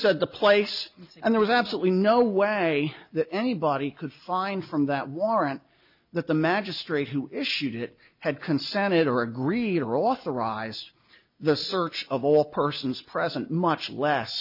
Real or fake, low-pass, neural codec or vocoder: real; 5.4 kHz; none